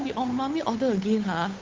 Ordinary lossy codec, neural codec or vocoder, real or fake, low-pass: Opus, 32 kbps; codec, 16 kHz, 8 kbps, FunCodec, trained on Chinese and English, 25 frames a second; fake; 7.2 kHz